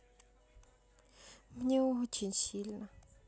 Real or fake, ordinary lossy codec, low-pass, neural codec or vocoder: real; none; none; none